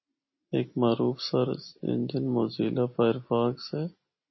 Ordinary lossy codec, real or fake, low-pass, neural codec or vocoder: MP3, 24 kbps; real; 7.2 kHz; none